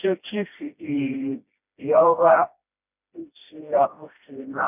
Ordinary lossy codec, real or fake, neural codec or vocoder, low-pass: none; fake; codec, 16 kHz, 1 kbps, FreqCodec, smaller model; 3.6 kHz